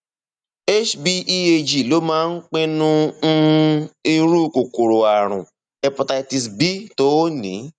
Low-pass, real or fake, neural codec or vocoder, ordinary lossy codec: 9.9 kHz; real; none; none